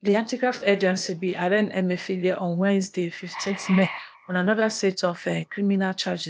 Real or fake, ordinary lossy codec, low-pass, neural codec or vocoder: fake; none; none; codec, 16 kHz, 0.8 kbps, ZipCodec